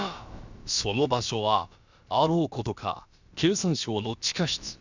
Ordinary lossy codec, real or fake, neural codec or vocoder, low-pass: Opus, 64 kbps; fake; codec, 16 kHz, about 1 kbps, DyCAST, with the encoder's durations; 7.2 kHz